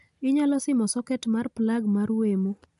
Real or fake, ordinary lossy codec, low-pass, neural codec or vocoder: real; none; 10.8 kHz; none